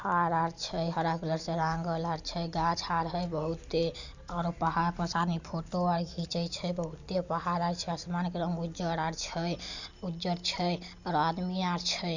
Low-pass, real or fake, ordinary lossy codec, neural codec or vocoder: 7.2 kHz; real; none; none